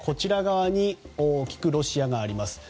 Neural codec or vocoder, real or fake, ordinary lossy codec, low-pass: none; real; none; none